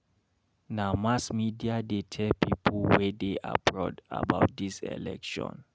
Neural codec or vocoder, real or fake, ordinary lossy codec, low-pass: none; real; none; none